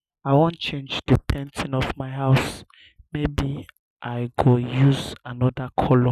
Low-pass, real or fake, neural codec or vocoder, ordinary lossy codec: 14.4 kHz; real; none; none